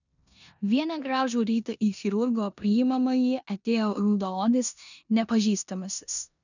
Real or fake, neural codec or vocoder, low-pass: fake; codec, 16 kHz in and 24 kHz out, 0.9 kbps, LongCat-Audio-Codec, four codebook decoder; 7.2 kHz